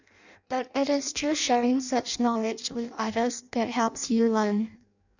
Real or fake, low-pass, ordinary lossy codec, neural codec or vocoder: fake; 7.2 kHz; none; codec, 16 kHz in and 24 kHz out, 0.6 kbps, FireRedTTS-2 codec